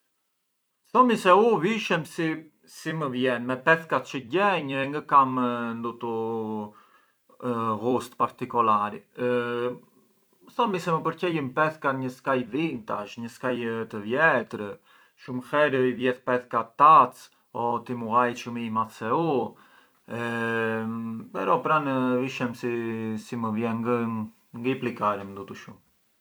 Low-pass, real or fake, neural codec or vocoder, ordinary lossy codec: none; fake; vocoder, 44.1 kHz, 128 mel bands every 256 samples, BigVGAN v2; none